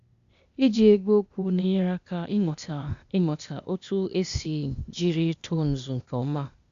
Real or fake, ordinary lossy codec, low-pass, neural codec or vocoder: fake; Opus, 64 kbps; 7.2 kHz; codec, 16 kHz, 0.8 kbps, ZipCodec